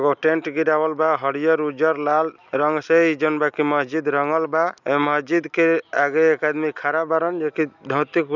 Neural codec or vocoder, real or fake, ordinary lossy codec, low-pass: none; real; none; 7.2 kHz